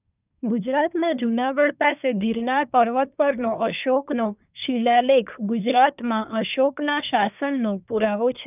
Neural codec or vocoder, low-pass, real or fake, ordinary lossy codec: codec, 24 kHz, 1 kbps, SNAC; 3.6 kHz; fake; none